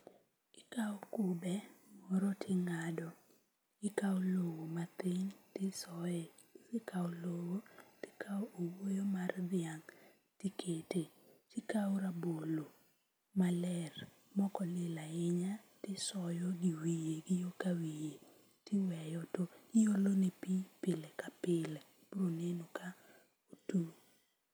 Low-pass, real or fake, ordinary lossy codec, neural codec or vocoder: none; real; none; none